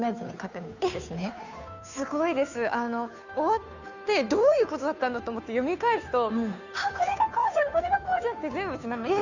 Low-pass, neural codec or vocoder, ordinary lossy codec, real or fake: 7.2 kHz; codec, 16 kHz, 2 kbps, FunCodec, trained on Chinese and English, 25 frames a second; none; fake